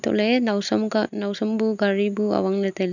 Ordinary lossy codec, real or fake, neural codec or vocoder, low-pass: none; real; none; 7.2 kHz